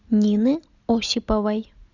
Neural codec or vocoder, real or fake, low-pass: none; real; 7.2 kHz